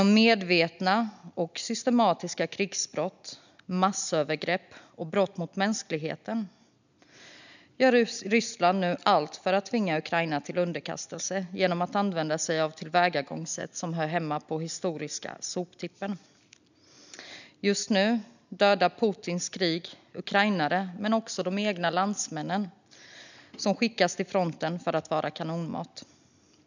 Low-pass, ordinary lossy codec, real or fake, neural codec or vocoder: 7.2 kHz; none; real; none